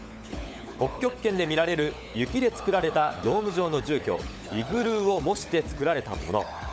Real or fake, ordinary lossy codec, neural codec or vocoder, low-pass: fake; none; codec, 16 kHz, 16 kbps, FunCodec, trained on LibriTTS, 50 frames a second; none